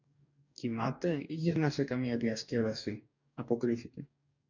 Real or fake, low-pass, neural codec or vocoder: fake; 7.2 kHz; codec, 44.1 kHz, 2.6 kbps, DAC